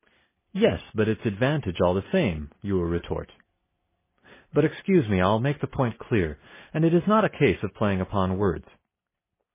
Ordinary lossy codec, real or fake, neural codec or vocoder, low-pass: MP3, 16 kbps; real; none; 3.6 kHz